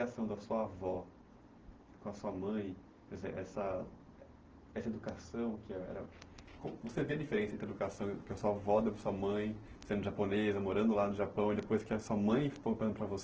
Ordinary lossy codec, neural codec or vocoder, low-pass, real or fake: Opus, 16 kbps; none; 7.2 kHz; real